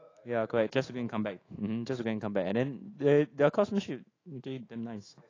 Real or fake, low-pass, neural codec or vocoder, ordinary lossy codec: fake; 7.2 kHz; autoencoder, 48 kHz, 128 numbers a frame, DAC-VAE, trained on Japanese speech; AAC, 32 kbps